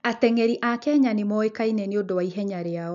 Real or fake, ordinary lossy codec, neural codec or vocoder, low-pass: real; MP3, 64 kbps; none; 7.2 kHz